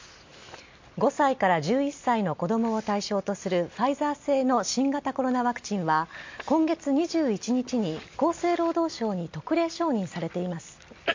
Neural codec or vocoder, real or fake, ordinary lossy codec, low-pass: none; real; MP3, 48 kbps; 7.2 kHz